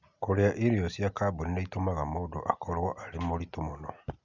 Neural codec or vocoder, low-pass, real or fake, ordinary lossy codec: none; 7.2 kHz; real; none